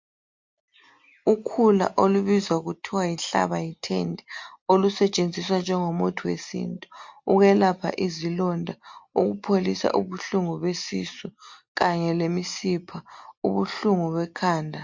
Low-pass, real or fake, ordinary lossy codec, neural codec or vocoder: 7.2 kHz; real; MP3, 48 kbps; none